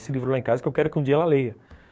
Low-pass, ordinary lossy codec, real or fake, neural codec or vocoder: none; none; fake; codec, 16 kHz, 6 kbps, DAC